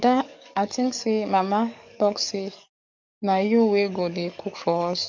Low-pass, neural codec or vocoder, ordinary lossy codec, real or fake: 7.2 kHz; codec, 44.1 kHz, 7.8 kbps, DAC; none; fake